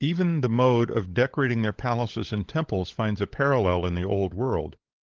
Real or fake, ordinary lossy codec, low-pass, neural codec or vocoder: fake; Opus, 16 kbps; 7.2 kHz; codec, 16 kHz, 16 kbps, FunCodec, trained on LibriTTS, 50 frames a second